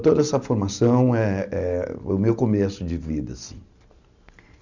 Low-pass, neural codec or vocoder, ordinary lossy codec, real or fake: 7.2 kHz; none; none; real